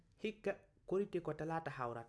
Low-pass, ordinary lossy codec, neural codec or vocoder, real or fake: none; none; none; real